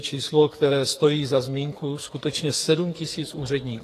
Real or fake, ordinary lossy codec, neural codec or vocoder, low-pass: fake; AAC, 48 kbps; codec, 44.1 kHz, 2.6 kbps, SNAC; 14.4 kHz